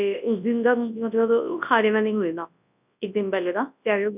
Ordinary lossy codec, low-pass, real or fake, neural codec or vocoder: none; 3.6 kHz; fake; codec, 24 kHz, 0.9 kbps, WavTokenizer, large speech release